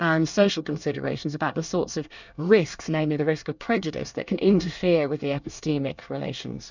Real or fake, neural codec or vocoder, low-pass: fake; codec, 24 kHz, 1 kbps, SNAC; 7.2 kHz